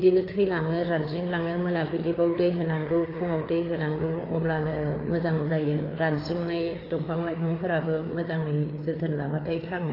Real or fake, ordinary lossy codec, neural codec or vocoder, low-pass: fake; AAC, 24 kbps; codec, 16 kHz, 4 kbps, FreqCodec, larger model; 5.4 kHz